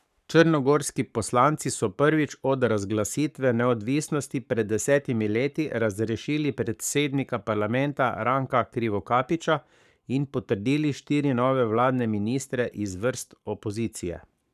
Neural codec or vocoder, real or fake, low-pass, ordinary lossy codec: codec, 44.1 kHz, 7.8 kbps, Pupu-Codec; fake; 14.4 kHz; none